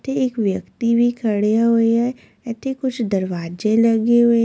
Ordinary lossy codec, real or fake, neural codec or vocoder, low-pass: none; real; none; none